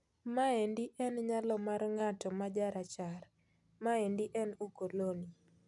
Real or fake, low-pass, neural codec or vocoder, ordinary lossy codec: real; 10.8 kHz; none; none